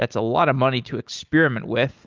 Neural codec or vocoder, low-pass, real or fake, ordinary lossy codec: none; 7.2 kHz; real; Opus, 24 kbps